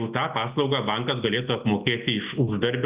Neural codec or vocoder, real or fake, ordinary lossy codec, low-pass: none; real; Opus, 32 kbps; 3.6 kHz